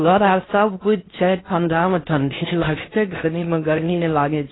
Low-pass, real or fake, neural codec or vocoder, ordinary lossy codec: 7.2 kHz; fake; codec, 16 kHz in and 24 kHz out, 0.6 kbps, FocalCodec, streaming, 4096 codes; AAC, 16 kbps